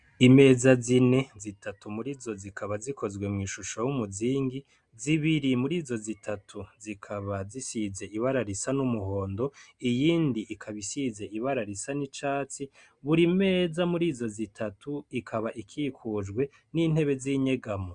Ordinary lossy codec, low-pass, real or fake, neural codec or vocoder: Opus, 64 kbps; 9.9 kHz; real; none